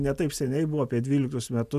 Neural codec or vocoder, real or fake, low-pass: none; real; 14.4 kHz